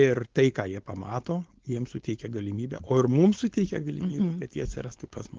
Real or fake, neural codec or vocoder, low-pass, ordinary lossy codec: fake; codec, 16 kHz, 4.8 kbps, FACodec; 7.2 kHz; Opus, 16 kbps